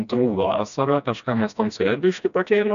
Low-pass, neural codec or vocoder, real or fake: 7.2 kHz; codec, 16 kHz, 1 kbps, FreqCodec, smaller model; fake